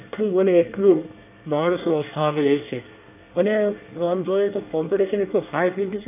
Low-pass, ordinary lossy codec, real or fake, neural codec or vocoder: 3.6 kHz; none; fake; codec, 24 kHz, 1 kbps, SNAC